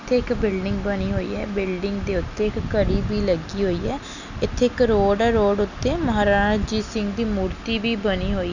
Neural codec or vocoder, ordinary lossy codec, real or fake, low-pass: none; none; real; 7.2 kHz